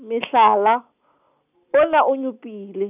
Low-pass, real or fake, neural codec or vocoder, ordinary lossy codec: 3.6 kHz; real; none; none